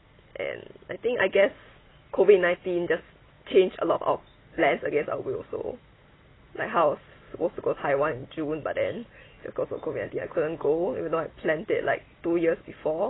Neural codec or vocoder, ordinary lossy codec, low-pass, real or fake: none; AAC, 16 kbps; 7.2 kHz; real